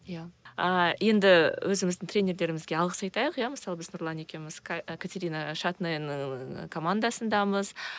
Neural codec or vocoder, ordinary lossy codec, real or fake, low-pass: none; none; real; none